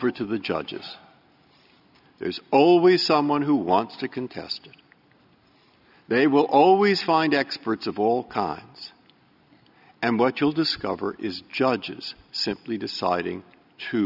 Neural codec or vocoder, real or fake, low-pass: none; real; 5.4 kHz